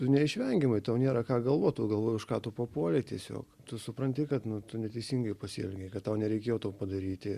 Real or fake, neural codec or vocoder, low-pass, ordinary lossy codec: real; none; 14.4 kHz; Opus, 64 kbps